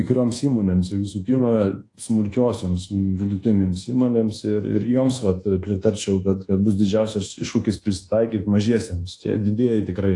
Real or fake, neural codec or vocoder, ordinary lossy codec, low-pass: fake; codec, 24 kHz, 1.2 kbps, DualCodec; AAC, 48 kbps; 10.8 kHz